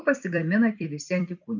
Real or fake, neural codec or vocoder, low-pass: fake; vocoder, 44.1 kHz, 128 mel bands every 256 samples, BigVGAN v2; 7.2 kHz